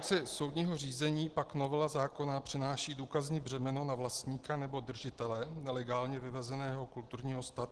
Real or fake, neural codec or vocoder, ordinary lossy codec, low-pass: real; none; Opus, 16 kbps; 10.8 kHz